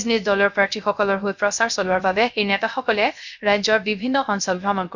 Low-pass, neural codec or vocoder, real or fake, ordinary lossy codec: 7.2 kHz; codec, 16 kHz, 0.3 kbps, FocalCodec; fake; none